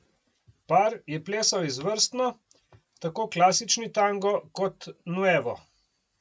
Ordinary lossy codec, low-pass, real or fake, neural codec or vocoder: none; none; real; none